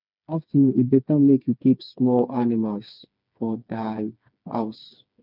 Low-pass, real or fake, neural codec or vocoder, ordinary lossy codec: 5.4 kHz; fake; codec, 16 kHz, 4 kbps, FreqCodec, smaller model; none